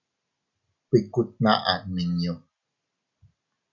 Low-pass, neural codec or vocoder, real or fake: 7.2 kHz; none; real